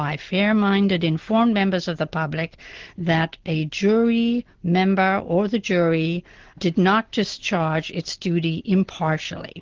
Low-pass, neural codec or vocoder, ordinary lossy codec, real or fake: 7.2 kHz; none; Opus, 16 kbps; real